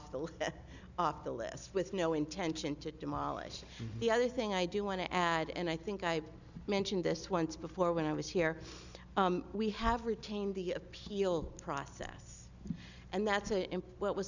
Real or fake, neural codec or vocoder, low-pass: real; none; 7.2 kHz